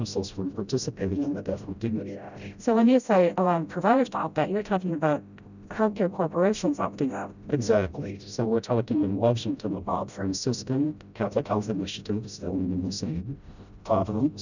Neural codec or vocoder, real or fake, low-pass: codec, 16 kHz, 0.5 kbps, FreqCodec, smaller model; fake; 7.2 kHz